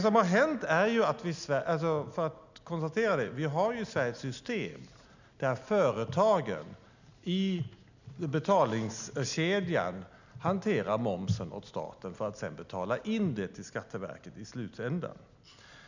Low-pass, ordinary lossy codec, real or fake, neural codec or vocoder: 7.2 kHz; none; real; none